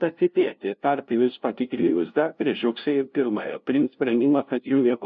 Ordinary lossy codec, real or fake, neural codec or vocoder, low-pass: MP3, 48 kbps; fake; codec, 16 kHz, 0.5 kbps, FunCodec, trained on LibriTTS, 25 frames a second; 7.2 kHz